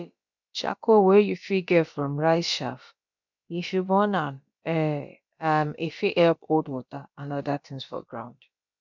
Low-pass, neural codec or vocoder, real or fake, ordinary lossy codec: 7.2 kHz; codec, 16 kHz, about 1 kbps, DyCAST, with the encoder's durations; fake; none